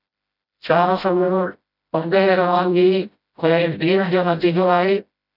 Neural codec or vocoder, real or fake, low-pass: codec, 16 kHz, 0.5 kbps, FreqCodec, smaller model; fake; 5.4 kHz